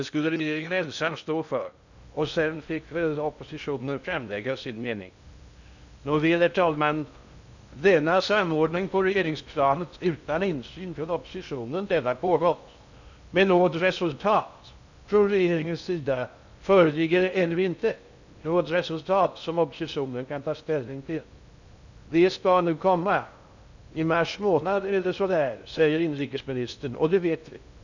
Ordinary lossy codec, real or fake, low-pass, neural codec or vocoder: none; fake; 7.2 kHz; codec, 16 kHz in and 24 kHz out, 0.6 kbps, FocalCodec, streaming, 2048 codes